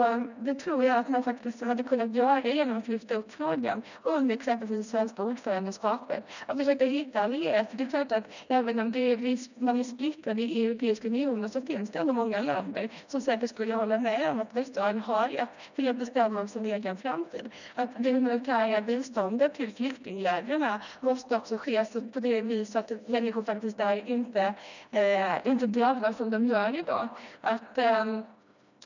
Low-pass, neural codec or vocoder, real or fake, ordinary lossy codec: 7.2 kHz; codec, 16 kHz, 1 kbps, FreqCodec, smaller model; fake; none